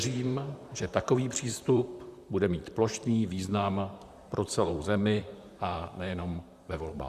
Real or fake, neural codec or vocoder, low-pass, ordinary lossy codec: fake; vocoder, 44.1 kHz, 128 mel bands, Pupu-Vocoder; 14.4 kHz; Opus, 64 kbps